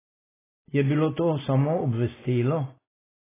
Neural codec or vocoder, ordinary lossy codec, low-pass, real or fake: none; AAC, 16 kbps; 3.6 kHz; real